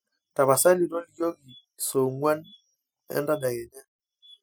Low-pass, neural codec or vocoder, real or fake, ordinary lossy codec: none; none; real; none